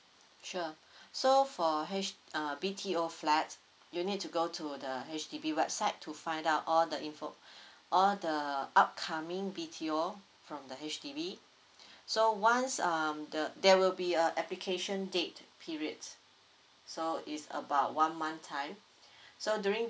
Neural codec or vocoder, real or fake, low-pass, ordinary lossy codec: none; real; none; none